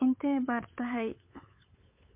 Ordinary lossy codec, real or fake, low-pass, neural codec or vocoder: MP3, 32 kbps; fake; 3.6 kHz; codec, 16 kHz, 8 kbps, FunCodec, trained on LibriTTS, 25 frames a second